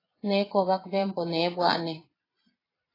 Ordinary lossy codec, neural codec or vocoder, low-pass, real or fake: AAC, 24 kbps; vocoder, 22.05 kHz, 80 mel bands, Vocos; 5.4 kHz; fake